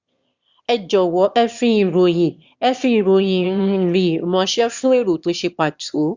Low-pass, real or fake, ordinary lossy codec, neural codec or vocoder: 7.2 kHz; fake; Opus, 64 kbps; autoencoder, 22.05 kHz, a latent of 192 numbers a frame, VITS, trained on one speaker